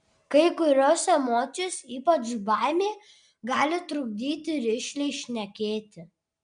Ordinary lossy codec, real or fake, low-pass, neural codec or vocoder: MP3, 64 kbps; real; 9.9 kHz; none